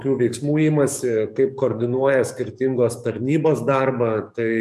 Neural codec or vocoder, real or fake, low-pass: codec, 44.1 kHz, 7.8 kbps, DAC; fake; 14.4 kHz